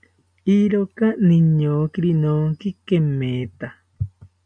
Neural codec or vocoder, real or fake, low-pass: none; real; 9.9 kHz